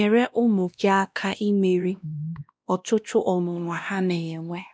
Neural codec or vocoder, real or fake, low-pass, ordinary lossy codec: codec, 16 kHz, 1 kbps, X-Codec, WavLM features, trained on Multilingual LibriSpeech; fake; none; none